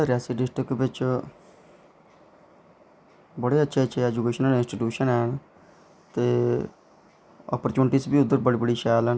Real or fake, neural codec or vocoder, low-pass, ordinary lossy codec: real; none; none; none